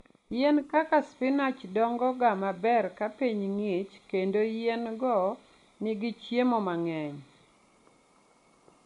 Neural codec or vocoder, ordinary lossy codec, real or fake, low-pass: none; MP3, 64 kbps; real; 10.8 kHz